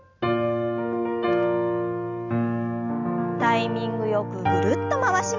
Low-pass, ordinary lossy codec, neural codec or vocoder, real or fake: 7.2 kHz; none; none; real